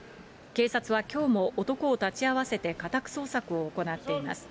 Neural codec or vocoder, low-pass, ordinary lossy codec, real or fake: none; none; none; real